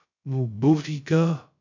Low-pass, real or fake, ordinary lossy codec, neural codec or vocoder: 7.2 kHz; fake; MP3, 64 kbps; codec, 16 kHz, 0.2 kbps, FocalCodec